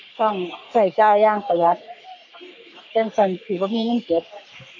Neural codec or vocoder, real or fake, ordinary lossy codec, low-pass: codec, 44.1 kHz, 3.4 kbps, Pupu-Codec; fake; none; 7.2 kHz